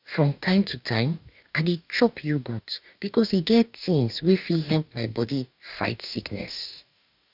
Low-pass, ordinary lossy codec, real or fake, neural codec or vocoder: 5.4 kHz; none; fake; codec, 44.1 kHz, 2.6 kbps, DAC